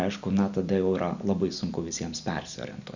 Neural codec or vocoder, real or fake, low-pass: none; real; 7.2 kHz